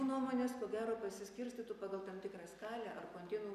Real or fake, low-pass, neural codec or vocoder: real; 14.4 kHz; none